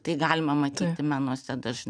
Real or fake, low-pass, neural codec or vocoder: real; 9.9 kHz; none